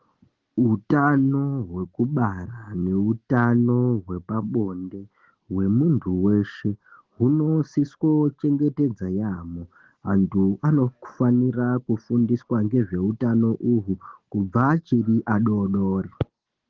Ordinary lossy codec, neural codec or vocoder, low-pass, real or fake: Opus, 16 kbps; none; 7.2 kHz; real